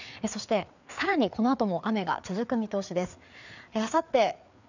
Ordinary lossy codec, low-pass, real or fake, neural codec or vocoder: none; 7.2 kHz; fake; codec, 16 kHz, 4 kbps, FreqCodec, larger model